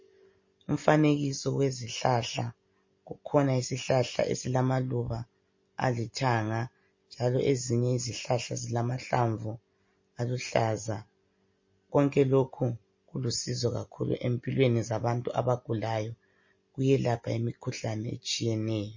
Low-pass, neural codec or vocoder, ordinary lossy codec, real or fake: 7.2 kHz; none; MP3, 32 kbps; real